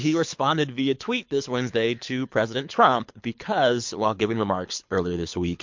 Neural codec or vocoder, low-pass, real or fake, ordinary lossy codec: codec, 24 kHz, 6 kbps, HILCodec; 7.2 kHz; fake; MP3, 48 kbps